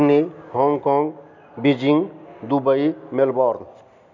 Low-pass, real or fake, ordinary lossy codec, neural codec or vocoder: 7.2 kHz; real; none; none